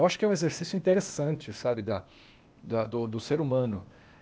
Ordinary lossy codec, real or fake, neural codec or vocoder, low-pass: none; fake; codec, 16 kHz, 0.8 kbps, ZipCodec; none